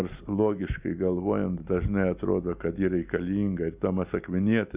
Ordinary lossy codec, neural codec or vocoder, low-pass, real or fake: Opus, 64 kbps; none; 3.6 kHz; real